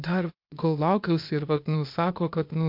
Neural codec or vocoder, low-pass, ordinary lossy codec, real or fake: codec, 16 kHz, 0.8 kbps, ZipCodec; 5.4 kHz; MP3, 48 kbps; fake